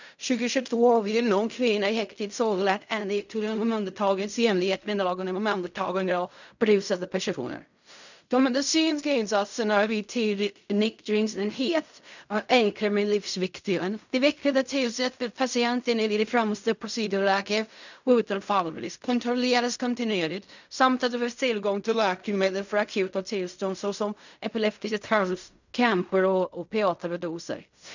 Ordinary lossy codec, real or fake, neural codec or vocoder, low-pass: none; fake; codec, 16 kHz in and 24 kHz out, 0.4 kbps, LongCat-Audio-Codec, fine tuned four codebook decoder; 7.2 kHz